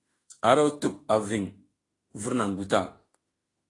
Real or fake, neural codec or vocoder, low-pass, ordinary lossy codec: fake; autoencoder, 48 kHz, 32 numbers a frame, DAC-VAE, trained on Japanese speech; 10.8 kHz; AAC, 32 kbps